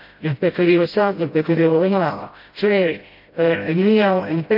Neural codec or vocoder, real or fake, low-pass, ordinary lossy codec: codec, 16 kHz, 0.5 kbps, FreqCodec, smaller model; fake; 5.4 kHz; MP3, 32 kbps